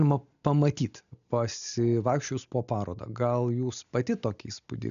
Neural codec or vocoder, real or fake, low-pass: none; real; 7.2 kHz